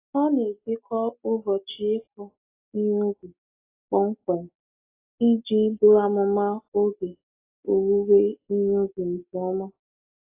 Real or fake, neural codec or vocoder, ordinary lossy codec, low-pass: real; none; AAC, 24 kbps; 3.6 kHz